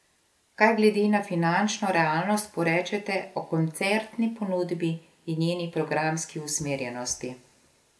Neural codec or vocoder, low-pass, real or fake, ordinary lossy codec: none; none; real; none